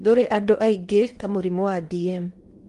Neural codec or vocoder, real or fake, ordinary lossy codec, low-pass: codec, 16 kHz in and 24 kHz out, 0.8 kbps, FocalCodec, streaming, 65536 codes; fake; Opus, 24 kbps; 10.8 kHz